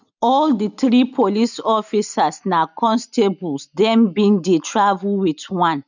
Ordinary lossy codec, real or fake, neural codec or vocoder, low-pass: none; real; none; 7.2 kHz